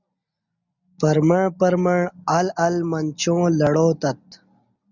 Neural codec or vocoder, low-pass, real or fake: none; 7.2 kHz; real